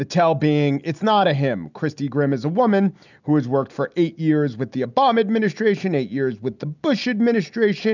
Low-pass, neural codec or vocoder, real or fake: 7.2 kHz; none; real